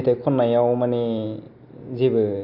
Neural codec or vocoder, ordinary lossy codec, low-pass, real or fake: none; none; 5.4 kHz; real